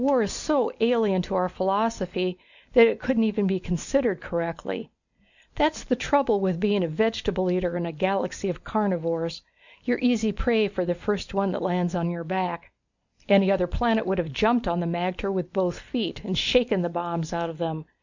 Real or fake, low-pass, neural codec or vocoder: real; 7.2 kHz; none